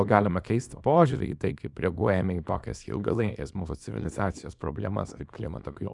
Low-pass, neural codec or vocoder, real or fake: 10.8 kHz; codec, 24 kHz, 0.9 kbps, WavTokenizer, small release; fake